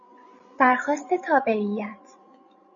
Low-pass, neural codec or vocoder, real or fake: 7.2 kHz; codec, 16 kHz, 16 kbps, FreqCodec, larger model; fake